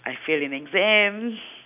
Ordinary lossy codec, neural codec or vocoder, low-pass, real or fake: none; none; 3.6 kHz; real